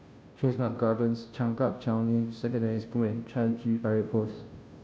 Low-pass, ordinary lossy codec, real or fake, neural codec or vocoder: none; none; fake; codec, 16 kHz, 0.5 kbps, FunCodec, trained on Chinese and English, 25 frames a second